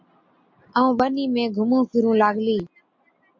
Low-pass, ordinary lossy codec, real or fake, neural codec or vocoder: 7.2 kHz; MP3, 48 kbps; real; none